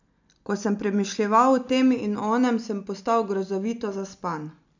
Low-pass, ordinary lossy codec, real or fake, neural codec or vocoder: 7.2 kHz; none; real; none